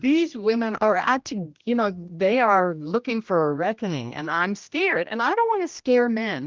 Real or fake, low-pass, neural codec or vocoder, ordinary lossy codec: fake; 7.2 kHz; codec, 16 kHz, 1 kbps, X-Codec, HuBERT features, trained on general audio; Opus, 24 kbps